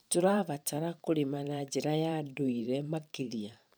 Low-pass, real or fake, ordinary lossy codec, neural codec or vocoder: none; fake; none; vocoder, 44.1 kHz, 128 mel bands every 512 samples, BigVGAN v2